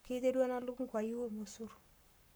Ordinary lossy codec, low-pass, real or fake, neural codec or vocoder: none; none; fake; codec, 44.1 kHz, 7.8 kbps, Pupu-Codec